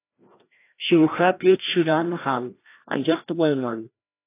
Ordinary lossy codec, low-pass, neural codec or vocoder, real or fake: AAC, 24 kbps; 3.6 kHz; codec, 16 kHz, 1 kbps, FreqCodec, larger model; fake